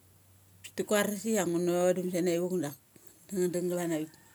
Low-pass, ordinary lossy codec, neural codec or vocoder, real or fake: none; none; none; real